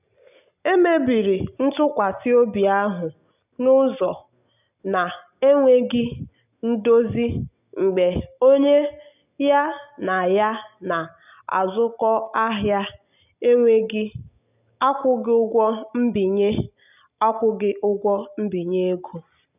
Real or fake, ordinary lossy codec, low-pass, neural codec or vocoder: real; none; 3.6 kHz; none